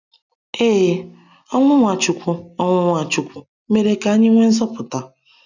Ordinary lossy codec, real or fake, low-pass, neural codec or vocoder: none; real; 7.2 kHz; none